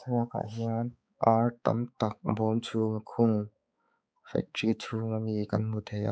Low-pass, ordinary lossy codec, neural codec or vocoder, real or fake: none; none; codec, 16 kHz, 4 kbps, X-Codec, HuBERT features, trained on balanced general audio; fake